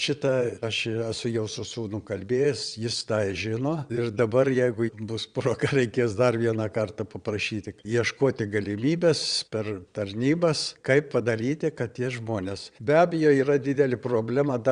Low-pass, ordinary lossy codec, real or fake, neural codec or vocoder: 9.9 kHz; Opus, 64 kbps; fake; vocoder, 22.05 kHz, 80 mel bands, Vocos